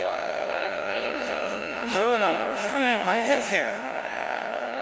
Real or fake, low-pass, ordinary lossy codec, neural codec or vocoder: fake; none; none; codec, 16 kHz, 0.5 kbps, FunCodec, trained on LibriTTS, 25 frames a second